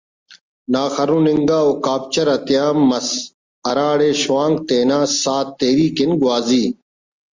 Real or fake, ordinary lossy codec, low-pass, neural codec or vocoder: real; Opus, 32 kbps; 7.2 kHz; none